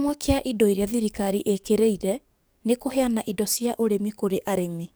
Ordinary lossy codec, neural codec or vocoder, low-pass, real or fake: none; codec, 44.1 kHz, 7.8 kbps, DAC; none; fake